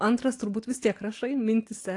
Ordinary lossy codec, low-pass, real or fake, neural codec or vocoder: AAC, 48 kbps; 10.8 kHz; real; none